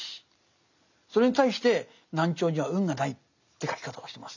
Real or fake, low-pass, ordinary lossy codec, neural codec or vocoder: real; 7.2 kHz; none; none